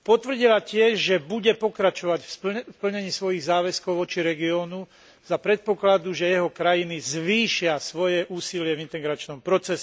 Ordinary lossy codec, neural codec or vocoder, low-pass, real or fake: none; none; none; real